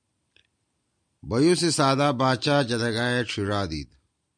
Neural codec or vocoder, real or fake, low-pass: none; real; 9.9 kHz